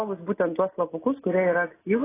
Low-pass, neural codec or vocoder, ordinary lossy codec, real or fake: 3.6 kHz; none; AAC, 16 kbps; real